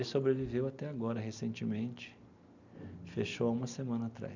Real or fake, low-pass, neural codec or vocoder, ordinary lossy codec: real; 7.2 kHz; none; none